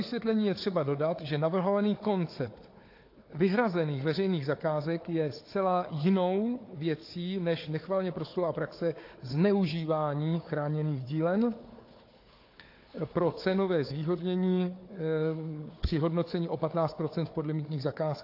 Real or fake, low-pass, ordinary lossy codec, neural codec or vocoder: fake; 5.4 kHz; AAC, 32 kbps; codec, 16 kHz, 4 kbps, FunCodec, trained on Chinese and English, 50 frames a second